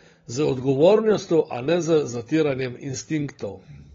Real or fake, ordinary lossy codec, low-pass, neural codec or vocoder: fake; AAC, 24 kbps; 7.2 kHz; codec, 16 kHz, 16 kbps, FunCodec, trained on LibriTTS, 50 frames a second